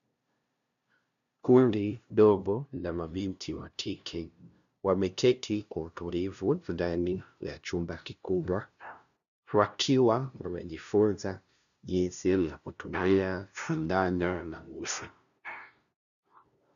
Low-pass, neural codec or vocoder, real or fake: 7.2 kHz; codec, 16 kHz, 0.5 kbps, FunCodec, trained on LibriTTS, 25 frames a second; fake